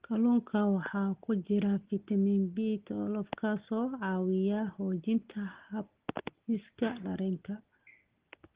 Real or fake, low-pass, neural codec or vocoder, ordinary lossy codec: real; 3.6 kHz; none; Opus, 16 kbps